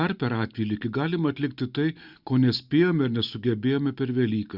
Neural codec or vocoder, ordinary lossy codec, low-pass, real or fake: none; Opus, 64 kbps; 5.4 kHz; real